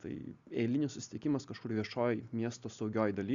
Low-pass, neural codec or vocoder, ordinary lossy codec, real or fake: 7.2 kHz; none; Opus, 64 kbps; real